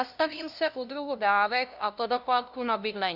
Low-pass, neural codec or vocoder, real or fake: 5.4 kHz; codec, 16 kHz, 0.5 kbps, FunCodec, trained on LibriTTS, 25 frames a second; fake